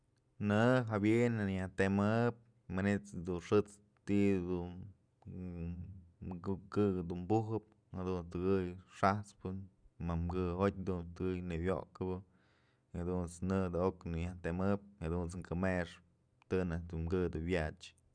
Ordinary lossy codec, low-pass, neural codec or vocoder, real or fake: none; 9.9 kHz; none; real